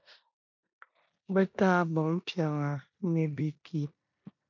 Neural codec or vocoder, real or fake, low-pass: codec, 16 kHz in and 24 kHz out, 0.9 kbps, LongCat-Audio-Codec, four codebook decoder; fake; 7.2 kHz